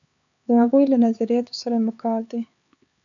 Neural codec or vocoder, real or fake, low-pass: codec, 16 kHz, 4 kbps, X-Codec, HuBERT features, trained on LibriSpeech; fake; 7.2 kHz